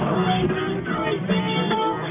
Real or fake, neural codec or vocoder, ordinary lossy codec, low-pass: fake; codec, 44.1 kHz, 1.7 kbps, Pupu-Codec; none; 3.6 kHz